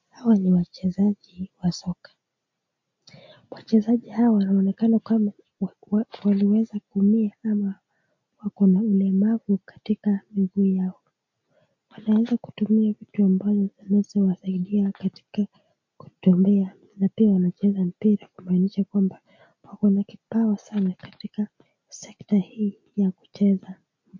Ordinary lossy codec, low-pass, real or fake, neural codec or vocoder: MP3, 48 kbps; 7.2 kHz; real; none